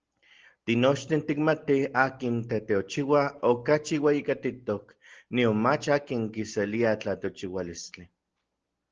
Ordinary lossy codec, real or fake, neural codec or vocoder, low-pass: Opus, 16 kbps; real; none; 7.2 kHz